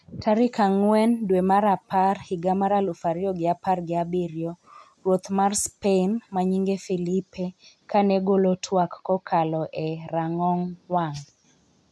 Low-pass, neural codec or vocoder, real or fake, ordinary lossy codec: none; none; real; none